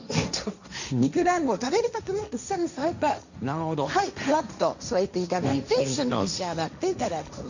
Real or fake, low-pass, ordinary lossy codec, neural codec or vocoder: fake; 7.2 kHz; none; codec, 16 kHz, 1.1 kbps, Voila-Tokenizer